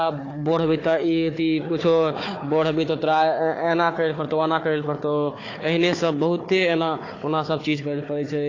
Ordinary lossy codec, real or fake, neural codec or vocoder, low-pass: AAC, 32 kbps; fake; codec, 16 kHz, 4 kbps, FunCodec, trained on Chinese and English, 50 frames a second; 7.2 kHz